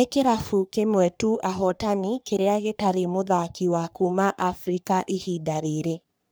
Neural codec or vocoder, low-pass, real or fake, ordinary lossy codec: codec, 44.1 kHz, 3.4 kbps, Pupu-Codec; none; fake; none